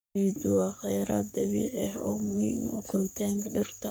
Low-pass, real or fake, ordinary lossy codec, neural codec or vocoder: none; fake; none; codec, 44.1 kHz, 3.4 kbps, Pupu-Codec